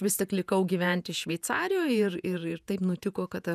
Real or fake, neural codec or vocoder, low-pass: fake; vocoder, 48 kHz, 128 mel bands, Vocos; 14.4 kHz